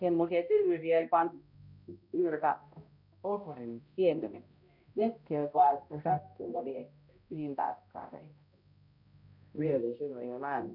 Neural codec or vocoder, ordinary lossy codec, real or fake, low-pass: codec, 16 kHz, 0.5 kbps, X-Codec, HuBERT features, trained on balanced general audio; none; fake; 5.4 kHz